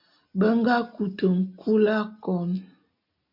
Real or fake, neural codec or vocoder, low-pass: real; none; 5.4 kHz